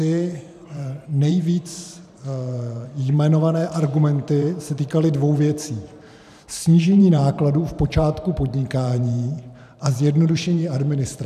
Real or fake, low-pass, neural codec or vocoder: fake; 14.4 kHz; vocoder, 44.1 kHz, 128 mel bands every 512 samples, BigVGAN v2